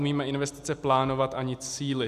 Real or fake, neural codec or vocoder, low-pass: real; none; 14.4 kHz